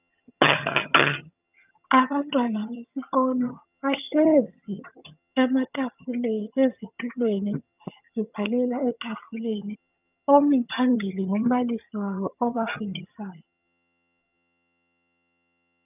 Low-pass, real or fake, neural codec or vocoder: 3.6 kHz; fake; vocoder, 22.05 kHz, 80 mel bands, HiFi-GAN